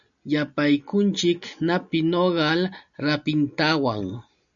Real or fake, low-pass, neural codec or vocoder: real; 7.2 kHz; none